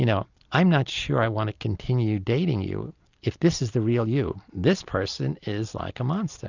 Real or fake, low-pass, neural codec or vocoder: real; 7.2 kHz; none